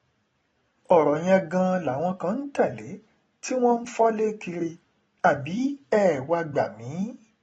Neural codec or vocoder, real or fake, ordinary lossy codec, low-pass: none; real; AAC, 24 kbps; 19.8 kHz